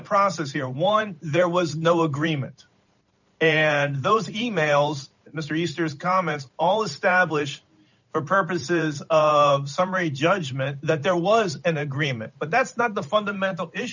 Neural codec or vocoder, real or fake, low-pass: vocoder, 44.1 kHz, 128 mel bands every 512 samples, BigVGAN v2; fake; 7.2 kHz